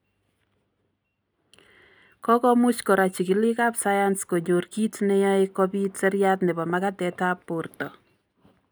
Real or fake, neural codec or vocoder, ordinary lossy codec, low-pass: real; none; none; none